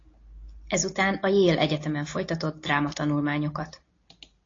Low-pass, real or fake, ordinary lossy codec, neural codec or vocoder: 7.2 kHz; real; AAC, 32 kbps; none